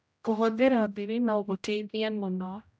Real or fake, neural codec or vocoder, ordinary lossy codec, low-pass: fake; codec, 16 kHz, 0.5 kbps, X-Codec, HuBERT features, trained on general audio; none; none